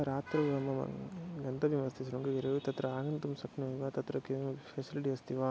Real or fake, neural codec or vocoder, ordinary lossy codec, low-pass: real; none; none; none